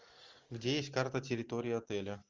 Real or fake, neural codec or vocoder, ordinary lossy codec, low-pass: real; none; Opus, 32 kbps; 7.2 kHz